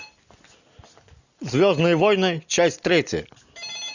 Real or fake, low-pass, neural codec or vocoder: real; 7.2 kHz; none